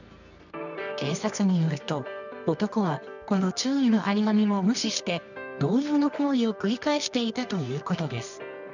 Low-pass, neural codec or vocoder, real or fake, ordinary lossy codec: 7.2 kHz; codec, 24 kHz, 0.9 kbps, WavTokenizer, medium music audio release; fake; none